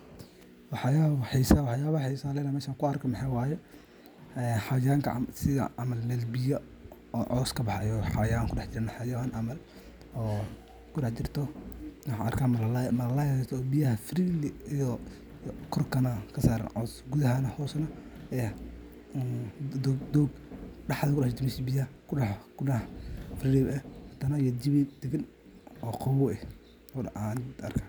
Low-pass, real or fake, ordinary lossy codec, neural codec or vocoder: none; real; none; none